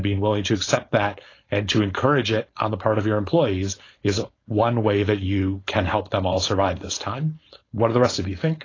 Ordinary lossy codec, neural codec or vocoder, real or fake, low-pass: AAC, 32 kbps; codec, 16 kHz, 4.8 kbps, FACodec; fake; 7.2 kHz